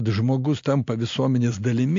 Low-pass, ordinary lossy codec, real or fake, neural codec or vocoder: 7.2 kHz; AAC, 48 kbps; real; none